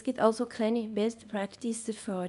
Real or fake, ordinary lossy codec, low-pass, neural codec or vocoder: fake; none; 10.8 kHz; codec, 24 kHz, 0.9 kbps, WavTokenizer, small release